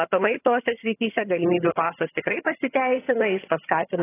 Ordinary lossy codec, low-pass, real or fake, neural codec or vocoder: AAC, 16 kbps; 3.6 kHz; fake; vocoder, 22.05 kHz, 80 mel bands, HiFi-GAN